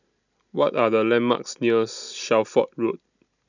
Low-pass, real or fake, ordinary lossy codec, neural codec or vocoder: 7.2 kHz; real; none; none